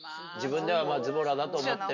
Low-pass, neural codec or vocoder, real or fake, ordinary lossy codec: 7.2 kHz; none; real; none